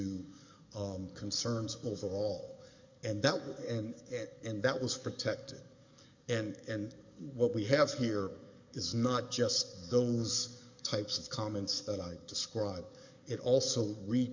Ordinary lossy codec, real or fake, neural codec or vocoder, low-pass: MP3, 64 kbps; fake; codec, 44.1 kHz, 7.8 kbps, DAC; 7.2 kHz